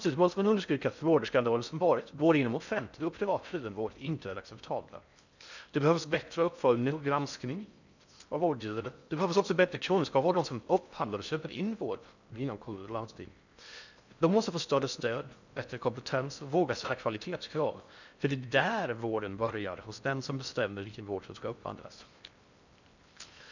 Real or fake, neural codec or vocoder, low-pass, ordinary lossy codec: fake; codec, 16 kHz in and 24 kHz out, 0.6 kbps, FocalCodec, streaming, 4096 codes; 7.2 kHz; none